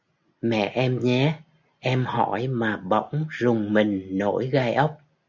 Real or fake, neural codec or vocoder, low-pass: real; none; 7.2 kHz